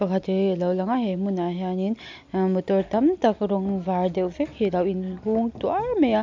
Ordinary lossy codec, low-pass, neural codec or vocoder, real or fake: MP3, 64 kbps; 7.2 kHz; none; real